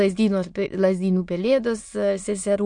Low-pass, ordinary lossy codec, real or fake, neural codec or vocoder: 9.9 kHz; MP3, 48 kbps; fake; autoencoder, 22.05 kHz, a latent of 192 numbers a frame, VITS, trained on many speakers